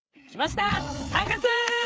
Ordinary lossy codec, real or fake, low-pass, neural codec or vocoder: none; fake; none; codec, 16 kHz, 4 kbps, FreqCodec, larger model